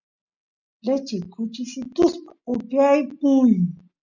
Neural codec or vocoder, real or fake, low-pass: none; real; 7.2 kHz